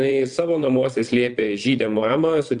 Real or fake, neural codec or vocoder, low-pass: fake; vocoder, 22.05 kHz, 80 mel bands, WaveNeXt; 9.9 kHz